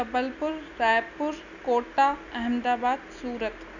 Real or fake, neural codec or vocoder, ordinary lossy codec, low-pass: real; none; none; 7.2 kHz